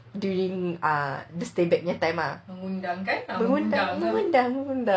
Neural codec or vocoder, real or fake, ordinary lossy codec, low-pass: none; real; none; none